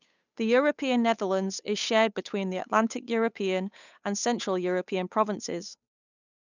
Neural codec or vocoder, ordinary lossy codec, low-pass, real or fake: codec, 16 kHz, 8 kbps, FunCodec, trained on Chinese and English, 25 frames a second; none; 7.2 kHz; fake